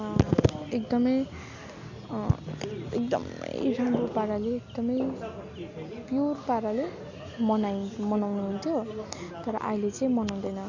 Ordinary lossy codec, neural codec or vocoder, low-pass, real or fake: none; none; 7.2 kHz; real